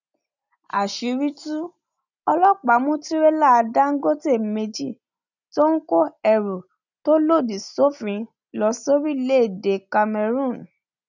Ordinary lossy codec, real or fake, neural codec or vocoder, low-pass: none; real; none; 7.2 kHz